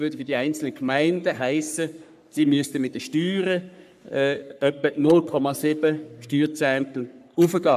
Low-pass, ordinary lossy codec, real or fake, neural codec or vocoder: 14.4 kHz; none; fake; codec, 44.1 kHz, 3.4 kbps, Pupu-Codec